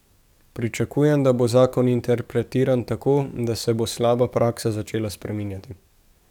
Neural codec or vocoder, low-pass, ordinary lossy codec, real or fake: codec, 44.1 kHz, 7.8 kbps, DAC; 19.8 kHz; none; fake